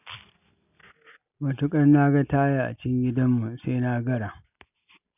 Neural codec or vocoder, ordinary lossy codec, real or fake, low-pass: none; none; real; 3.6 kHz